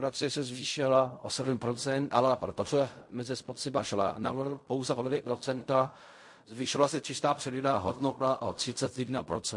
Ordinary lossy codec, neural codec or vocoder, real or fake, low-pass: MP3, 48 kbps; codec, 16 kHz in and 24 kHz out, 0.4 kbps, LongCat-Audio-Codec, fine tuned four codebook decoder; fake; 10.8 kHz